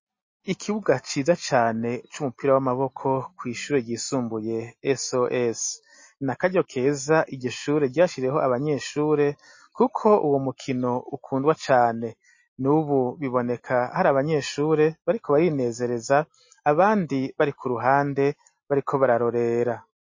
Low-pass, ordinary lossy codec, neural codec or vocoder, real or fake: 7.2 kHz; MP3, 32 kbps; none; real